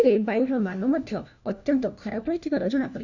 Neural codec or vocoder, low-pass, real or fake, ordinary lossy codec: codec, 16 kHz, 1 kbps, FunCodec, trained on LibriTTS, 50 frames a second; 7.2 kHz; fake; none